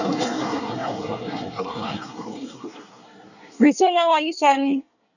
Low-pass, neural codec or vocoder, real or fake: 7.2 kHz; codec, 24 kHz, 1 kbps, SNAC; fake